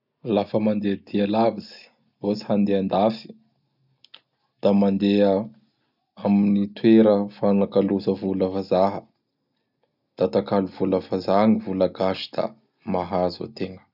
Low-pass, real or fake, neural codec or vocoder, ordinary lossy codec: 5.4 kHz; real; none; none